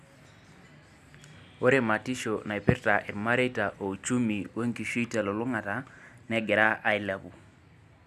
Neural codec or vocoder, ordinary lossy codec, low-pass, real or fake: none; none; 14.4 kHz; real